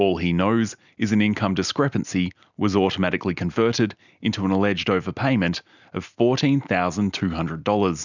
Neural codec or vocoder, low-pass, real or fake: none; 7.2 kHz; real